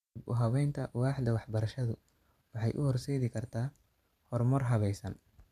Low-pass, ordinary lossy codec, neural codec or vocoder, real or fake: 14.4 kHz; none; vocoder, 44.1 kHz, 128 mel bands every 512 samples, BigVGAN v2; fake